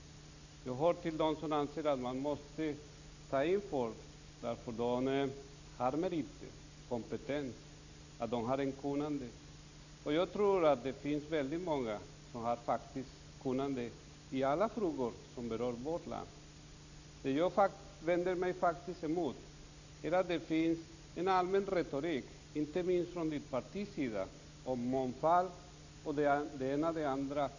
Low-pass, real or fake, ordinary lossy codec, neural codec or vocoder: 7.2 kHz; real; none; none